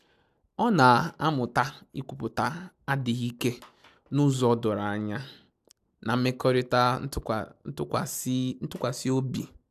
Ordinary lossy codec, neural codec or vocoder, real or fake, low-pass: AAC, 96 kbps; none; real; 14.4 kHz